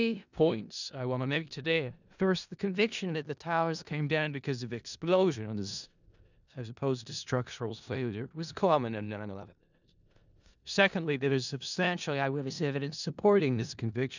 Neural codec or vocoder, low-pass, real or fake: codec, 16 kHz in and 24 kHz out, 0.4 kbps, LongCat-Audio-Codec, four codebook decoder; 7.2 kHz; fake